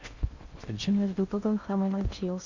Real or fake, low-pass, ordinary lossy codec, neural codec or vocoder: fake; 7.2 kHz; Opus, 64 kbps; codec, 16 kHz in and 24 kHz out, 0.8 kbps, FocalCodec, streaming, 65536 codes